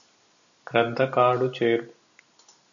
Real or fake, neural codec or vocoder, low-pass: real; none; 7.2 kHz